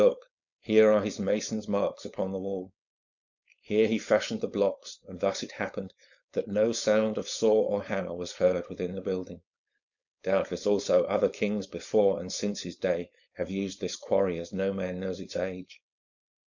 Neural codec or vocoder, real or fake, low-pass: codec, 16 kHz, 4.8 kbps, FACodec; fake; 7.2 kHz